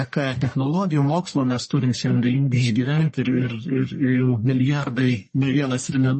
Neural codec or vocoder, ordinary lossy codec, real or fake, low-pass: codec, 44.1 kHz, 1.7 kbps, Pupu-Codec; MP3, 32 kbps; fake; 10.8 kHz